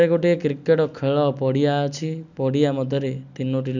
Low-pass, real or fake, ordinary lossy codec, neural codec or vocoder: 7.2 kHz; real; none; none